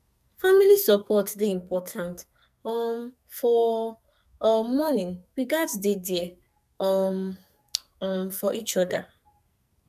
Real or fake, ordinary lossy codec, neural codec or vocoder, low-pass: fake; none; codec, 44.1 kHz, 2.6 kbps, SNAC; 14.4 kHz